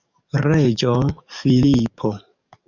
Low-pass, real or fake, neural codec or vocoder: 7.2 kHz; fake; codec, 44.1 kHz, 7.8 kbps, DAC